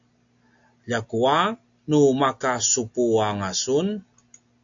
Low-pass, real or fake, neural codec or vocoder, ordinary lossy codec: 7.2 kHz; real; none; AAC, 64 kbps